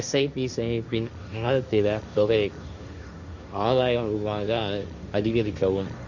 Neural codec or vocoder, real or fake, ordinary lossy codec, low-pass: codec, 16 kHz, 1.1 kbps, Voila-Tokenizer; fake; none; 7.2 kHz